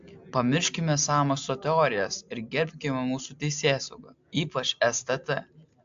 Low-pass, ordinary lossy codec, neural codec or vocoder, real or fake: 7.2 kHz; AAC, 64 kbps; none; real